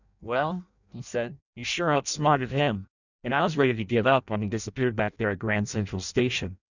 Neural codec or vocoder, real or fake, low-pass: codec, 16 kHz in and 24 kHz out, 0.6 kbps, FireRedTTS-2 codec; fake; 7.2 kHz